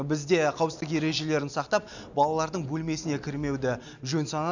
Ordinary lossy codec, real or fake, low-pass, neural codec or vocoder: none; real; 7.2 kHz; none